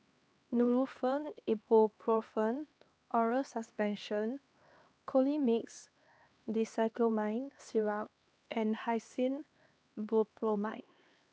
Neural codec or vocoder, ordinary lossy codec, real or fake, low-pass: codec, 16 kHz, 2 kbps, X-Codec, HuBERT features, trained on LibriSpeech; none; fake; none